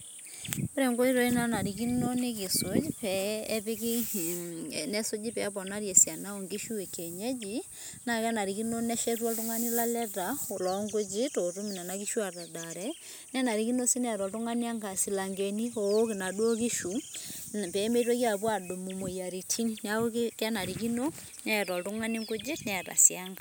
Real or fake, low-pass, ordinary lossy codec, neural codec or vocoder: real; none; none; none